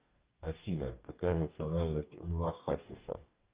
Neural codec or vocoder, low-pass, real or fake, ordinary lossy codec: codec, 44.1 kHz, 2.6 kbps, DAC; 3.6 kHz; fake; Opus, 24 kbps